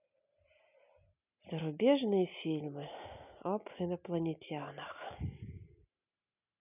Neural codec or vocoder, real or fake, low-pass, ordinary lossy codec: none; real; 3.6 kHz; none